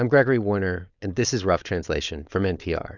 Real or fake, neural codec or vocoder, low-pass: fake; codec, 16 kHz, 4.8 kbps, FACodec; 7.2 kHz